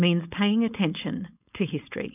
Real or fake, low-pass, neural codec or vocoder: fake; 3.6 kHz; codec, 16 kHz, 4.8 kbps, FACodec